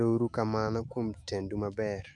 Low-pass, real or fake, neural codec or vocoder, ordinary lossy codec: 10.8 kHz; fake; autoencoder, 48 kHz, 128 numbers a frame, DAC-VAE, trained on Japanese speech; MP3, 96 kbps